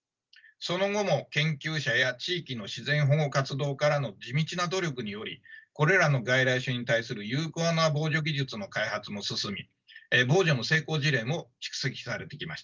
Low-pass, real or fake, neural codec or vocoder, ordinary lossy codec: 7.2 kHz; real; none; Opus, 24 kbps